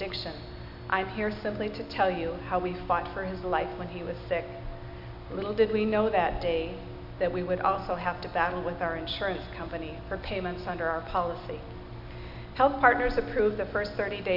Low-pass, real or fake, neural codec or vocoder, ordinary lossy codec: 5.4 kHz; real; none; AAC, 48 kbps